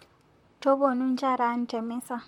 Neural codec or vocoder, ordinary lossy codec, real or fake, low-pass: vocoder, 44.1 kHz, 128 mel bands, Pupu-Vocoder; MP3, 64 kbps; fake; 19.8 kHz